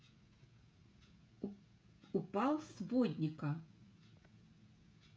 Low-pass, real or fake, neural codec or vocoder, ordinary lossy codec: none; fake; codec, 16 kHz, 16 kbps, FreqCodec, smaller model; none